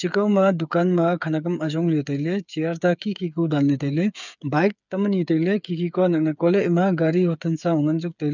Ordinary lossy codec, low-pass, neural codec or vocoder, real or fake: none; 7.2 kHz; codec, 16 kHz, 8 kbps, FreqCodec, smaller model; fake